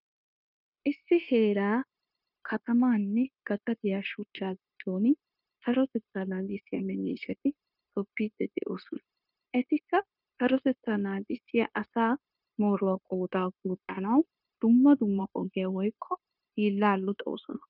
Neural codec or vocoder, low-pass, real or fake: codec, 16 kHz, 0.9 kbps, LongCat-Audio-Codec; 5.4 kHz; fake